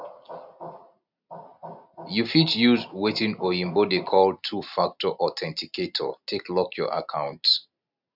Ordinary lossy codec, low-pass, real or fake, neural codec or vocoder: none; 5.4 kHz; real; none